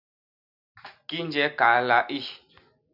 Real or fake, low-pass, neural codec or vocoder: real; 5.4 kHz; none